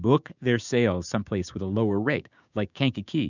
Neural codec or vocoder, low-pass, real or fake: codec, 16 kHz, 6 kbps, DAC; 7.2 kHz; fake